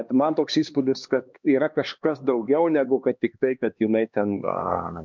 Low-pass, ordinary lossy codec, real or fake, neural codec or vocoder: 7.2 kHz; MP3, 64 kbps; fake; codec, 16 kHz, 2 kbps, X-Codec, HuBERT features, trained on LibriSpeech